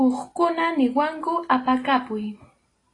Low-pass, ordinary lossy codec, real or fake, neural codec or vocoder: 10.8 kHz; AAC, 32 kbps; real; none